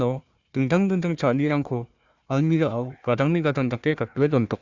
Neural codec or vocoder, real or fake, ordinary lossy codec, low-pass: codec, 16 kHz, 1 kbps, FunCodec, trained on Chinese and English, 50 frames a second; fake; Opus, 64 kbps; 7.2 kHz